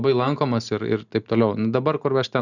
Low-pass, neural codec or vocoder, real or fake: 7.2 kHz; none; real